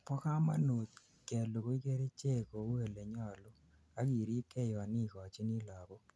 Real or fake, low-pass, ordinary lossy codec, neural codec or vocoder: real; none; none; none